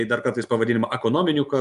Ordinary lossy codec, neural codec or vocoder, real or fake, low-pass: MP3, 96 kbps; none; real; 10.8 kHz